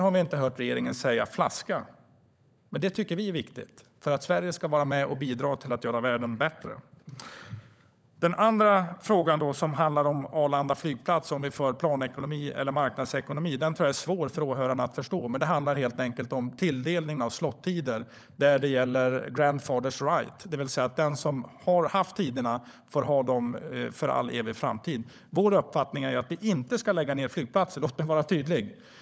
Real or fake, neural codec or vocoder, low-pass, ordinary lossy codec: fake; codec, 16 kHz, 16 kbps, FunCodec, trained on LibriTTS, 50 frames a second; none; none